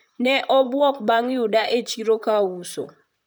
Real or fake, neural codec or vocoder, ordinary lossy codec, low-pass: fake; vocoder, 44.1 kHz, 128 mel bands, Pupu-Vocoder; none; none